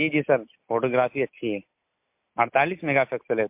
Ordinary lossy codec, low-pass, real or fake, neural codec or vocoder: MP3, 32 kbps; 3.6 kHz; real; none